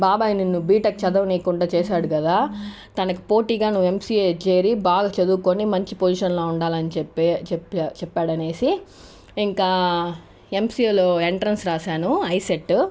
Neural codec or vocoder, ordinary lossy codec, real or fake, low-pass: none; none; real; none